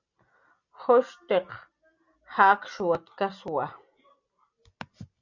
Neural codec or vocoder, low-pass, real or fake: none; 7.2 kHz; real